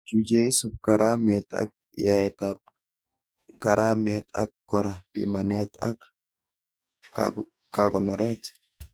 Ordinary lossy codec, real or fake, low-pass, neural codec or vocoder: none; fake; none; codec, 44.1 kHz, 2.6 kbps, DAC